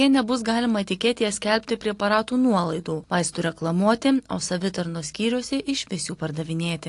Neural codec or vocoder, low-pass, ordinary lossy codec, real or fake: none; 10.8 kHz; AAC, 48 kbps; real